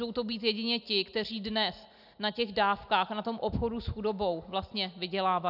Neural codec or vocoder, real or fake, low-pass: none; real; 5.4 kHz